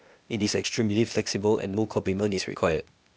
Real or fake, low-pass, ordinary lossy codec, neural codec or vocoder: fake; none; none; codec, 16 kHz, 0.8 kbps, ZipCodec